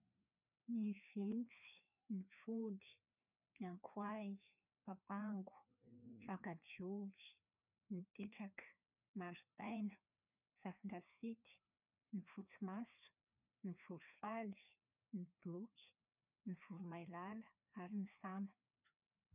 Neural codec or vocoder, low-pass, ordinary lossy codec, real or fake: codec, 16 kHz, 2 kbps, FreqCodec, larger model; 3.6 kHz; none; fake